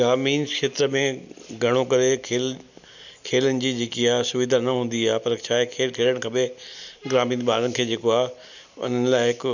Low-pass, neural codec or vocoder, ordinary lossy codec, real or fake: 7.2 kHz; none; none; real